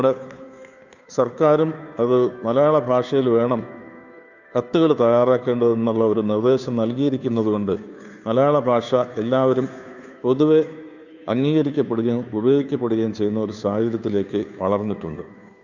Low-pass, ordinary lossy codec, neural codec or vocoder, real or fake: 7.2 kHz; none; codec, 16 kHz, 2 kbps, FunCodec, trained on Chinese and English, 25 frames a second; fake